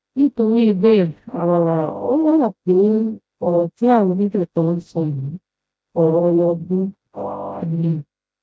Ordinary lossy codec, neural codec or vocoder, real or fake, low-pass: none; codec, 16 kHz, 0.5 kbps, FreqCodec, smaller model; fake; none